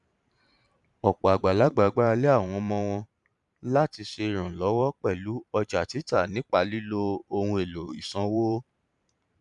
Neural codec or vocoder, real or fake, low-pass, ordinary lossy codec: vocoder, 44.1 kHz, 128 mel bands every 512 samples, BigVGAN v2; fake; 10.8 kHz; none